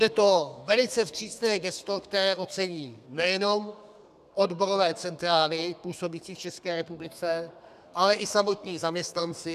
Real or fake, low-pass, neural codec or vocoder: fake; 14.4 kHz; codec, 32 kHz, 1.9 kbps, SNAC